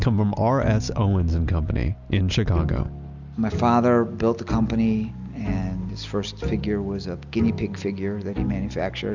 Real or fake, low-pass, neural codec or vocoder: real; 7.2 kHz; none